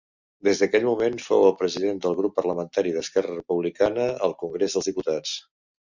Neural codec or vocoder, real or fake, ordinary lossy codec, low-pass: none; real; Opus, 64 kbps; 7.2 kHz